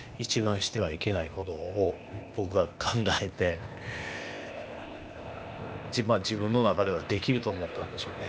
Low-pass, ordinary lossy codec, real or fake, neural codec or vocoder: none; none; fake; codec, 16 kHz, 0.8 kbps, ZipCodec